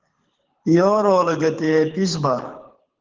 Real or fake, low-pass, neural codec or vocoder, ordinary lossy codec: fake; 7.2 kHz; codec, 24 kHz, 6 kbps, HILCodec; Opus, 16 kbps